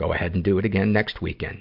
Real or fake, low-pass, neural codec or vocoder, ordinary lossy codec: real; 5.4 kHz; none; MP3, 48 kbps